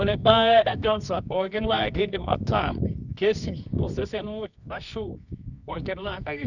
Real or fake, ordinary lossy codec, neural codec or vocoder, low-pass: fake; none; codec, 24 kHz, 0.9 kbps, WavTokenizer, medium music audio release; 7.2 kHz